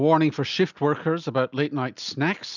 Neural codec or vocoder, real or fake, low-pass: none; real; 7.2 kHz